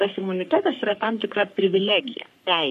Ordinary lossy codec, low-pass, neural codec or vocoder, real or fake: MP3, 64 kbps; 14.4 kHz; codec, 44.1 kHz, 3.4 kbps, Pupu-Codec; fake